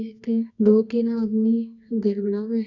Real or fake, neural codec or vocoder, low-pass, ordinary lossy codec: fake; codec, 24 kHz, 0.9 kbps, WavTokenizer, medium music audio release; 7.2 kHz; none